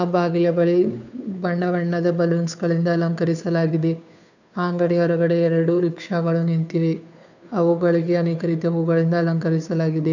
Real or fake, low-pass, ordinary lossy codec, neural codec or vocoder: fake; 7.2 kHz; none; codec, 16 kHz, 2 kbps, FunCodec, trained on Chinese and English, 25 frames a second